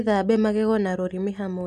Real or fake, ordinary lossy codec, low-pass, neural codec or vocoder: real; none; 14.4 kHz; none